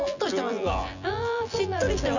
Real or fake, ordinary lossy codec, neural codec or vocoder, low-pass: fake; none; vocoder, 24 kHz, 100 mel bands, Vocos; 7.2 kHz